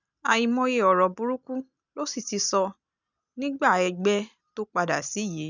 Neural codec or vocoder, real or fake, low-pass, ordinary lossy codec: none; real; 7.2 kHz; none